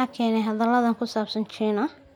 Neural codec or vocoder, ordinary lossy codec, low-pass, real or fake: none; none; 19.8 kHz; real